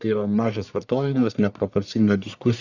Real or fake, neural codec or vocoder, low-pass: fake; codec, 44.1 kHz, 1.7 kbps, Pupu-Codec; 7.2 kHz